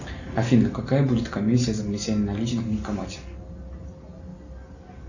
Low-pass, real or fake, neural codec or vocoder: 7.2 kHz; real; none